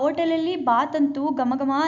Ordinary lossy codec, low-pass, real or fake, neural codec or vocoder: none; 7.2 kHz; real; none